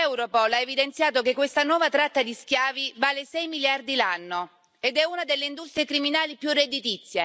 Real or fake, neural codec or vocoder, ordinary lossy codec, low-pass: real; none; none; none